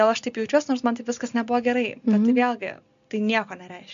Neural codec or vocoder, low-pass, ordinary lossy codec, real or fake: none; 7.2 kHz; AAC, 96 kbps; real